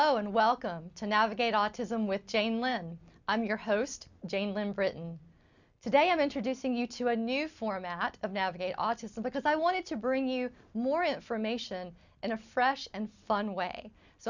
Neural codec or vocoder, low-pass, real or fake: none; 7.2 kHz; real